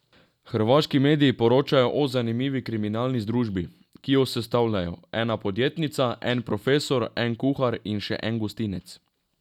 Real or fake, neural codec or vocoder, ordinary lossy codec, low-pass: real; none; none; 19.8 kHz